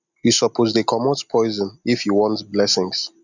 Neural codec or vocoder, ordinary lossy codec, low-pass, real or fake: none; none; 7.2 kHz; real